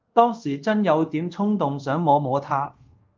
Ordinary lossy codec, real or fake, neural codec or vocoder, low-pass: Opus, 32 kbps; fake; codec, 24 kHz, 0.5 kbps, DualCodec; 7.2 kHz